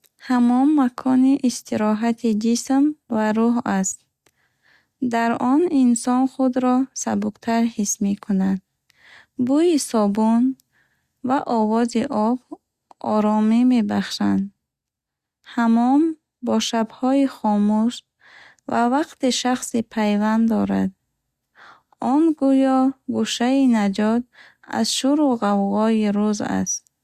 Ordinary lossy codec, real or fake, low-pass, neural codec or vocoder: Opus, 64 kbps; real; 14.4 kHz; none